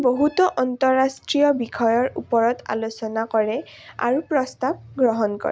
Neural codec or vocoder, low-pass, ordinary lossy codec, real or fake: none; none; none; real